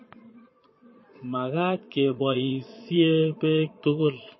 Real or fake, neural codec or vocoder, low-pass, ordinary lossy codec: fake; vocoder, 22.05 kHz, 80 mel bands, Vocos; 7.2 kHz; MP3, 24 kbps